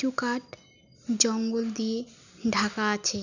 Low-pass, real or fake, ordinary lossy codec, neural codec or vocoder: 7.2 kHz; real; none; none